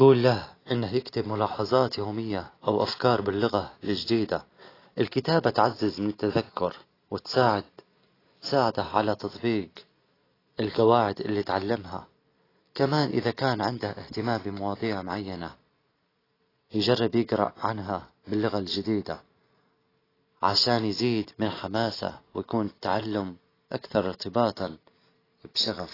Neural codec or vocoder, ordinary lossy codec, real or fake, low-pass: none; AAC, 24 kbps; real; 5.4 kHz